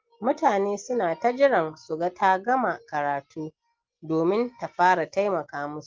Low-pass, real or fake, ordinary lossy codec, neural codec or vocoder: 7.2 kHz; real; Opus, 32 kbps; none